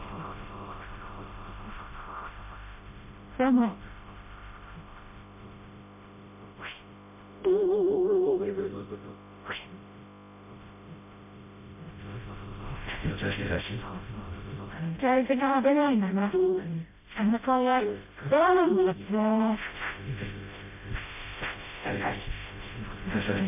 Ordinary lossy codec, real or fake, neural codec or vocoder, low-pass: MP3, 24 kbps; fake; codec, 16 kHz, 0.5 kbps, FreqCodec, smaller model; 3.6 kHz